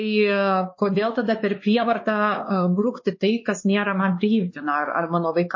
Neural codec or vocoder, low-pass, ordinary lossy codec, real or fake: codec, 16 kHz, 2 kbps, X-Codec, WavLM features, trained on Multilingual LibriSpeech; 7.2 kHz; MP3, 32 kbps; fake